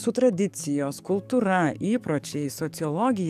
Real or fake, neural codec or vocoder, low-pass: fake; codec, 44.1 kHz, 7.8 kbps, DAC; 14.4 kHz